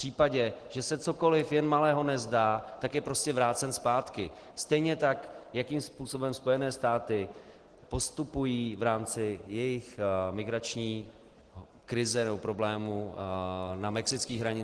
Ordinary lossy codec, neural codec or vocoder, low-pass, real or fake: Opus, 16 kbps; none; 10.8 kHz; real